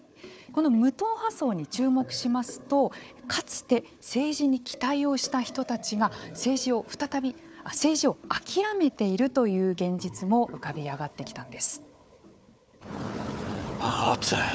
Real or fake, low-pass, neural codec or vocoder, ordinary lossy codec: fake; none; codec, 16 kHz, 4 kbps, FunCodec, trained on Chinese and English, 50 frames a second; none